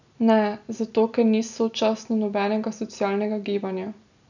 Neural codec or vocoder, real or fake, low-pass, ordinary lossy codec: none; real; 7.2 kHz; none